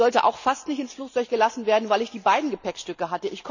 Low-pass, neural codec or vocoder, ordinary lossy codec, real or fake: 7.2 kHz; none; none; real